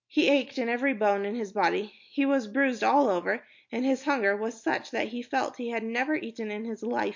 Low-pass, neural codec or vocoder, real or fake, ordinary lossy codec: 7.2 kHz; none; real; MP3, 48 kbps